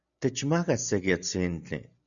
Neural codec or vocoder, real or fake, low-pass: none; real; 7.2 kHz